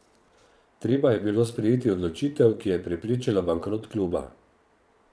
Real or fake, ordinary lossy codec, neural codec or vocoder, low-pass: fake; none; vocoder, 22.05 kHz, 80 mel bands, Vocos; none